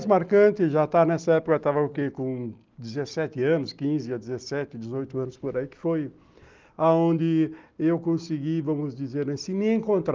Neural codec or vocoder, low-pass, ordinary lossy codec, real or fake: none; 7.2 kHz; Opus, 32 kbps; real